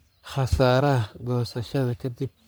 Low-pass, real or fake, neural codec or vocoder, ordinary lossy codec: none; fake; codec, 44.1 kHz, 3.4 kbps, Pupu-Codec; none